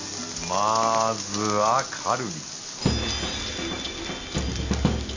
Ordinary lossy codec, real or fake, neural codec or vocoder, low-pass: AAC, 48 kbps; real; none; 7.2 kHz